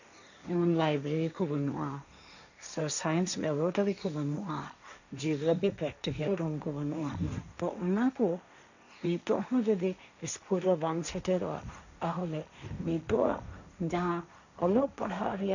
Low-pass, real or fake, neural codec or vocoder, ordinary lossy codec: 7.2 kHz; fake; codec, 16 kHz, 1.1 kbps, Voila-Tokenizer; none